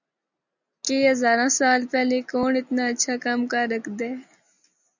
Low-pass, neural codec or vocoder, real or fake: 7.2 kHz; none; real